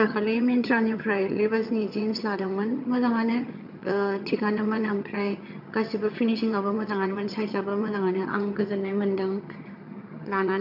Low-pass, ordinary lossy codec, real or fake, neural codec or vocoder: 5.4 kHz; none; fake; vocoder, 22.05 kHz, 80 mel bands, HiFi-GAN